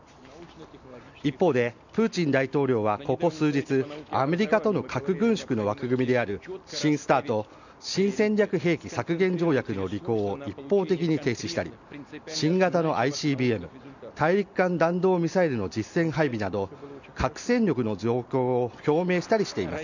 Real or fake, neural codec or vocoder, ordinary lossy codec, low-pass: real; none; none; 7.2 kHz